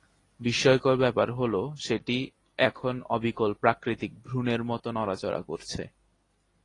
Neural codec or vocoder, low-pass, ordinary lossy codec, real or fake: none; 10.8 kHz; AAC, 32 kbps; real